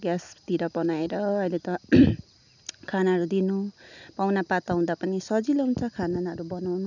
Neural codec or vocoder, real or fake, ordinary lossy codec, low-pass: none; real; none; 7.2 kHz